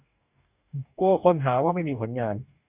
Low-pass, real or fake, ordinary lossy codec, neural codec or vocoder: 3.6 kHz; fake; Opus, 64 kbps; codec, 44.1 kHz, 2.6 kbps, DAC